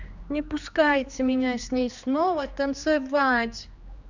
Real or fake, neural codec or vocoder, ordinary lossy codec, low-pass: fake; codec, 16 kHz, 2 kbps, X-Codec, HuBERT features, trained on general audio; none; 7.2 kHz